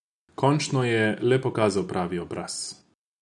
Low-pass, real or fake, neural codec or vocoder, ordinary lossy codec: 10.8 kHz; real; none; none